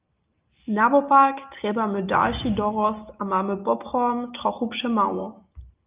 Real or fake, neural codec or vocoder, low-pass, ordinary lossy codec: real; none; 3.6 kHz; Opus, 24 kbps